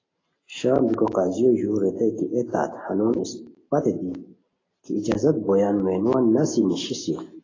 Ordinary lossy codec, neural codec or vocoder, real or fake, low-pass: AAC, 32 kbps; none; real; 7.2 kHz